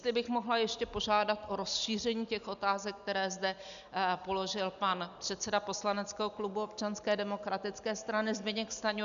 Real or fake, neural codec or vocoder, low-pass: real; none; 7.2 kHz